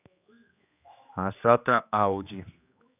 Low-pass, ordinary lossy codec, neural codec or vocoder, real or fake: 3.6 kHz; none; codec, 16 kHz, 2 kbps, X-Codec, HuBERT features, trained on general audio; fake